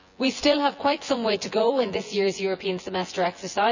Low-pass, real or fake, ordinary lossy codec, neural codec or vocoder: 7.2 kHz; fake; none; vocoder, 24 kHz, 100 mel bands, Vocos